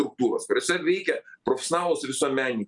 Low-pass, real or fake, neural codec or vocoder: 10.8 kHz; real; none